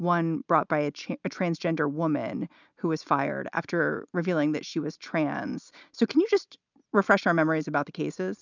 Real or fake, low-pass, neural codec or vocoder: real; 7.2 kHz; none